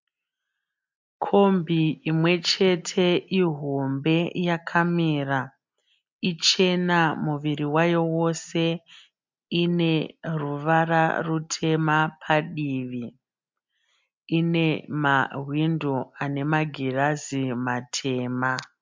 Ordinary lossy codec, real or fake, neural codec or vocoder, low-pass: MP3, 64 kbps; real; none; 7.2 kHz